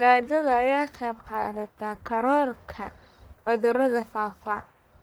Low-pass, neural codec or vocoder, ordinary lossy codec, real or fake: none; codec, 44.1 kHz, 1.7 kbps, Pupu-Codec; none; fake